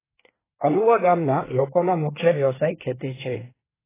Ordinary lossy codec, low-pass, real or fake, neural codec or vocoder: AAC, 16 kbps; 3.6 kHz; fake; codec, 24 kHz, 1 kbps, SNAC